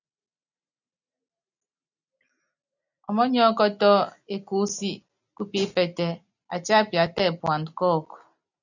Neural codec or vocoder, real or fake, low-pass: none; real; 7.2 kHz